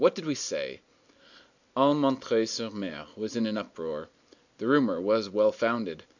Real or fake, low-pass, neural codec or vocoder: real; 7.2 kHz; none